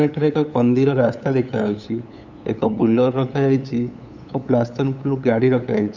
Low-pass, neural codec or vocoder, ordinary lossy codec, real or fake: 7.2 kHz; codec, 16 kHz, 8 kbps, FunCodec, trained on LibriTTS, 25 frames a second; none; fake